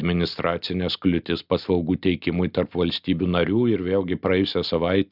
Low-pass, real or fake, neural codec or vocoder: 5.4 kHz; real; none